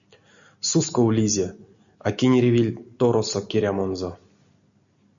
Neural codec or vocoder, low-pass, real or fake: none; 7.2 kHz; real